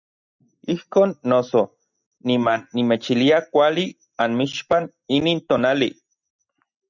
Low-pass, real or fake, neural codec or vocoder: 7.2 kHz; real; none